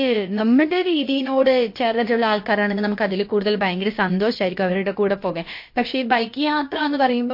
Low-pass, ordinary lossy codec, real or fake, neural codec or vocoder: 5.4 kHz; MP3, 32 kbps; fake; codec, 16 kHz, about 1 kbps, DyCAST, with the encoder's durations